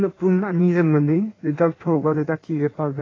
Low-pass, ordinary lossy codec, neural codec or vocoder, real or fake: 7.2 kHz; AAC, 32 kbps; codec, 16 kHz in and 24 kHz out, 1.1 kbps, FireRedTTS-2 codec; fake